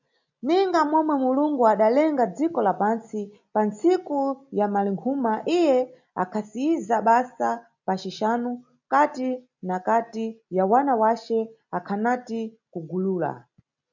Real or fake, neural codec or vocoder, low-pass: real; none; 7.2 kHz